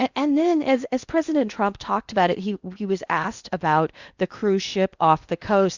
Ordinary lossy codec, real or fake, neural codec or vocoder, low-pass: Opus, 64 kbps; fake; codec, 16 kHz in and 24 kHz out, 0.8 kbps, FocalCodec, streaming, 65536 codes; 7.2 kHz